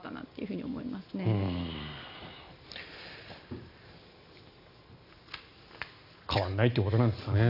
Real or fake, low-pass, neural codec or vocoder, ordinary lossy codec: real; 5.4 kHz; none; none